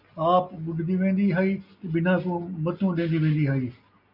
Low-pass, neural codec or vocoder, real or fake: 5.4 kHz; none; real